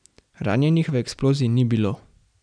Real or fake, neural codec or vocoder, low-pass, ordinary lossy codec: real; none; 9.9 kHz; none